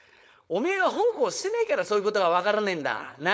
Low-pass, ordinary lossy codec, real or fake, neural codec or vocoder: none; none; fake; codec, 16 kHz, 4.8 kbps, FACodec